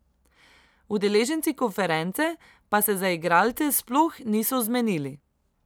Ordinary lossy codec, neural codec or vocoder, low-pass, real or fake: none; none; none; real